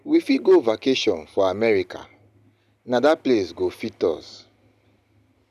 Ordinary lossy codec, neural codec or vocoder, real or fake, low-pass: none; none; real; 14.4 kHz